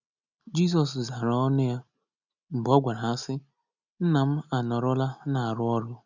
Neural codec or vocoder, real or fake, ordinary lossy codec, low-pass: none; real; none; 7.2 kHz